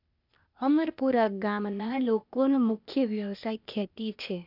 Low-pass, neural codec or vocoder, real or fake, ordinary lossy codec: 5.4 kHz; codec, 16 kHz, 0.8 kbps, ZipCodec; fake; none